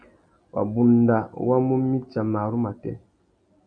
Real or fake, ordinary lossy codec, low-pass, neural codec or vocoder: real; AAC, 64 kbps; 9.9 kHz; none